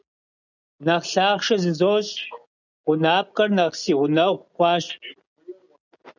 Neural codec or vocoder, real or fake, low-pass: none; real; 7.2 kHz